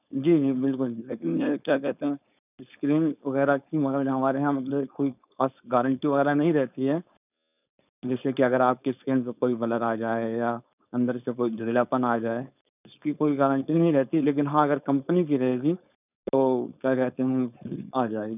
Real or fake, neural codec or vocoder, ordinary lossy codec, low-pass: fake; codec, 16 kHz, 4.8 kbps, FACodec; none; 3.6 kHz